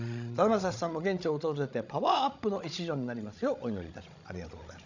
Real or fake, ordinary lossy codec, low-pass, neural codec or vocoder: fake; none; 7.2 kHz; codec, 16 kHz, 16 kbps, FreqCodec, larger model